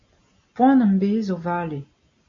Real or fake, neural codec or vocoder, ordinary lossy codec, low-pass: real; none; AAC, 48 kbps; 7.2 kHz